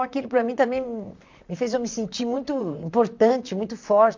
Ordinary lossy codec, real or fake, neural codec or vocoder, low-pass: MP3, 64 kbps; fake; vocoder, 44.1 kHz, 128 mel bands, Pupu-Vocoder; 7.2 kHz